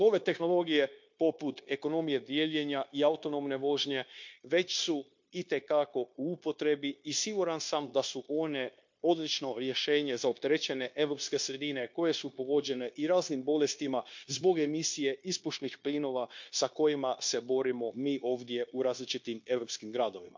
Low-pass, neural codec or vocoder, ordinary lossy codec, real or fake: 7.2 kHz; codec, 16 kHz, 0.9 kbps, LongCat-Audio-Codec; MP3, 48 kbps; fake